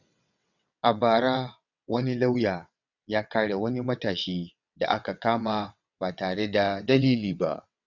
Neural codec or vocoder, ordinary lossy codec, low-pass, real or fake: vocoder, 22.05 kHz, 80 mel bands, WaveNeXt; none; 7.2 kHz; fake